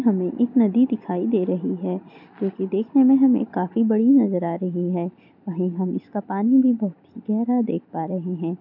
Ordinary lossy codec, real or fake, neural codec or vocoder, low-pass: MP3, 48 kbps; real; none; 5.4 kHz